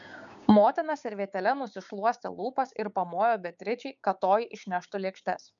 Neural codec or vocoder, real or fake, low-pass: codec, 16 kHz, 6 kbps, DAC; fake; 7.2 kHz